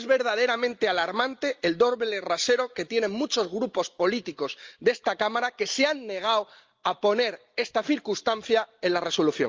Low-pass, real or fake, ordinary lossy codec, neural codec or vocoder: 7.2 kHz; real; Opus, 32 kbps; none